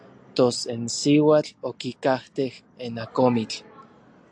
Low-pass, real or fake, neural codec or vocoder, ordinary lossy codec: 9.9 kHz; real; none; AAC, 64 kbps